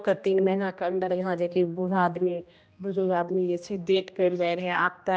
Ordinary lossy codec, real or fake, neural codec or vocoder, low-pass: none; fake; codec, 16 kHz, 1 kbps, X-Codec, HuBERT features, trained on general audio; none